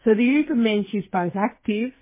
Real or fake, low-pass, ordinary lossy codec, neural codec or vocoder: fake; 3.6 kHz; MP3, 16 kbps; codec, 44.1 kHz, 2.6 kbps, SNAC